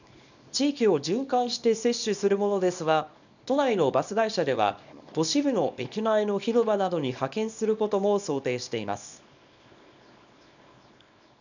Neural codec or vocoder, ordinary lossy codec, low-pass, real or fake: codec, 24 kHz, 0.9 kbps, WavTokenizer, small release; none; 7.2 kHz; fake